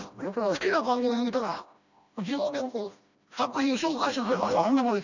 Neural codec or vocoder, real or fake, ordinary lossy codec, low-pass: codec, 16 kHz, 1 kbps, FreqCodec, smaller model; fake; none; 7.2 kHz